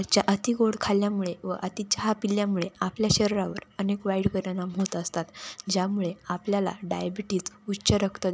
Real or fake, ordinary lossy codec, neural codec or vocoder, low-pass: real; none; none; none